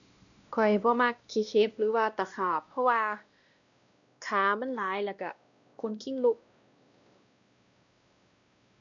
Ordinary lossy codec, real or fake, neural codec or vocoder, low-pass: none; fake; codec, 16 kHz, 1 kbps, X-Codec, WavLM features, trained on Multilingual LibriSpeech; 7.2 kHz